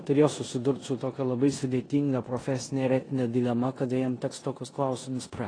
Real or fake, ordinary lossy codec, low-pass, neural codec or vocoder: fake; AAC, 32 kbps; 9.9 kHz; codec, 16 kHz in and 24 kHz out, 0.9 kbps, LongCat-Audio-Codec, four codebook decoder